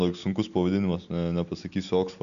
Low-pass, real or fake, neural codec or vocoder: 7.2 kHz; real; none